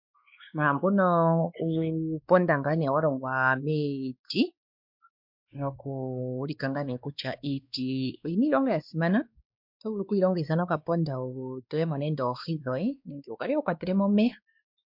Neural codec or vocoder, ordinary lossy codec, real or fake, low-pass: codec, 16 kHz, 2 kbps, X-Codec, WavLM features, trained on Multilingual LibriSpeech; MP3, 48 kbps; fake; 5.4 kHz